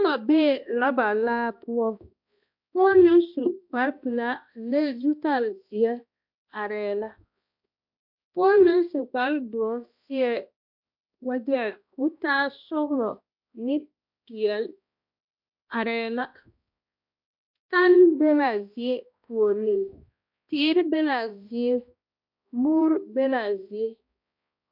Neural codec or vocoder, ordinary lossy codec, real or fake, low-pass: codec, 16 kHz, 1 kbps, X-Codec, HuBERT features, trained on balanced general audio; MP3, 48 kbps; fake; 5.4 kHz